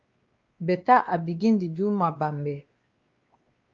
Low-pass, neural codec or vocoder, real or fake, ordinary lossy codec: 7.2 kHz; codec, 16 kHz, 2 kbps, X-Codec, WavLM features, trained on Multilingual LibriSpeech; fake; Opus, 32 kbps